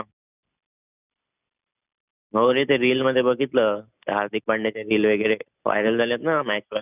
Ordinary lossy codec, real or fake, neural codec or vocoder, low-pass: none; real; none; 3.6 kHz